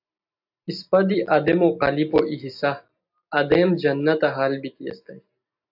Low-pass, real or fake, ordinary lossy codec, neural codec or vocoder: 5.4 kHz; real; AAC, 48 kbps; none